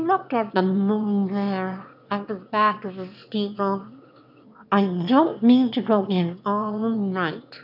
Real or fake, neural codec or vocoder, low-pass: fake; autoencoder, 22.05 kHz, a latent of 192 numbers a frame, VITS, trained on one speaker; 5.4 kHz